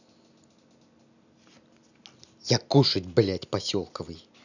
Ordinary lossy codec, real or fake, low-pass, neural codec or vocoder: none; real; 7.2 kHz; none